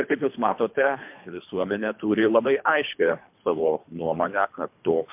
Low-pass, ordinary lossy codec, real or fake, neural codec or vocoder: 3.6 kHz; MP3, 32 kbps; fake; codec, 24 kHz, 3 kbps, HILCodec